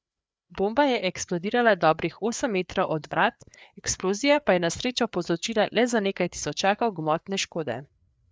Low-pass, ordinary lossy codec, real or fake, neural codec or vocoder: none; none; fake; codec, 16 kHz, 4 kbps, FreqCodec, larger model